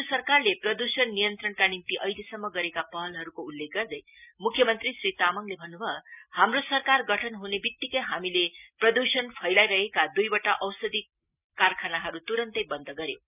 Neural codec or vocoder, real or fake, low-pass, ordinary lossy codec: none; real; 3.6 kHz; none